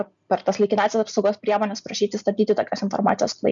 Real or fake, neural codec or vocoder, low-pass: real; none; 7.2 kHz